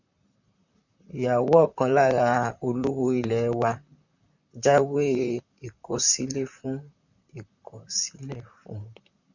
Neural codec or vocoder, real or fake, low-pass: vocoder, 44.1 kHz, 128 mel bands, Pupu-Vocoder; fake; 7.2 kHz